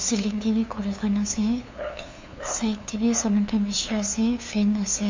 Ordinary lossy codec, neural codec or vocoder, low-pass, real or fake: MP3, 48 kbps; codec, 16 kHz, 2 kbps, FunCodec, trained on LibriTTS, 25 frames a second; 7.2 kHz; fake